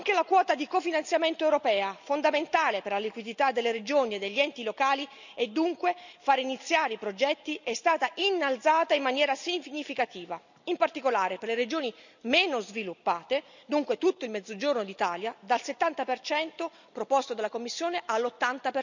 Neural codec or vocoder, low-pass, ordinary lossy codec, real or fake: none; 7.2 kHz; none; real